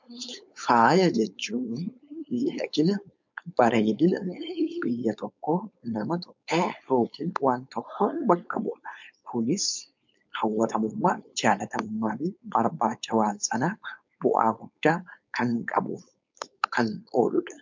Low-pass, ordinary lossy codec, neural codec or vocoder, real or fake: 7.2 kHz; MP3, 64 kbps; codec, 16 kHz, 4.8 kbps, FACodec; fake